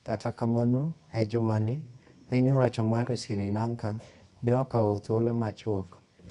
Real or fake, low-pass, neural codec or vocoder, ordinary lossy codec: fake; 10.8 kHz; codec, 24 kHz, 0.9 kbps, WavTokenizer, medium music audio release; none